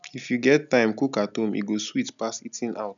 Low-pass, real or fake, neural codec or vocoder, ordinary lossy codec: 7.2 kHz; real; none; none